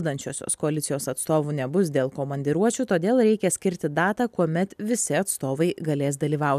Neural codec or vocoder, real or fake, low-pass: none; real; 14.4 kHz